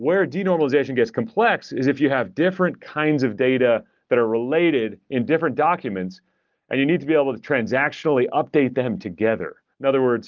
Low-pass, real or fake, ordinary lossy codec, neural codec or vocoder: 7.2 kHz; real; Opus, 32 kbps; none